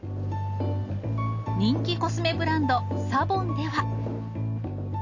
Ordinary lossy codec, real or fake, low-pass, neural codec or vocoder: AAC, 48 kbps; real; 7.2 kHz; none